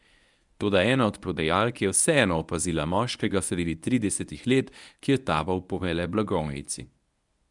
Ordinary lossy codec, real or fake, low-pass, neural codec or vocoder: none; fake; 10.8 kHz; codec, 24 kHz, 0.9 kbps, WavTokenizer, medium speech release version 1